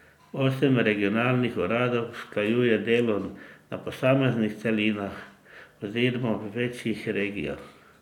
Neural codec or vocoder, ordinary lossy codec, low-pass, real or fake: none; none; 19.8 kHz; real